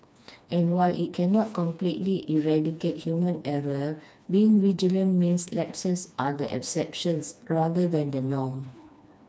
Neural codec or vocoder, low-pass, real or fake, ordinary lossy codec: codec, 16 kHz, 2 kbps, FreqCodec, smaller model; none; fake; none